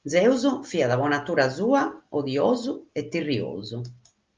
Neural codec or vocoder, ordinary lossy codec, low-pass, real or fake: none; Opus, 24 kbps; 7.2 kHz; real